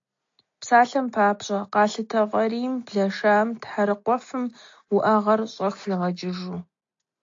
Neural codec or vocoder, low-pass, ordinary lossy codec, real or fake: none; 7.2 kHz; AAC, 48 kbps; real